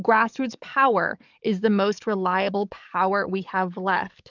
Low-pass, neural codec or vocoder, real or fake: 7.2 kHz; codec, 44.1 kHz, 7.8 kbps, DAC; fake